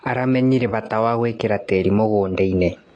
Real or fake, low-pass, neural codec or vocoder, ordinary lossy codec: real; 9.9 kHz; none; AAC, 48 kbps